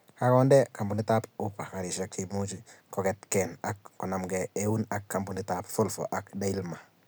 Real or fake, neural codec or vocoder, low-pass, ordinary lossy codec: real; none; none; none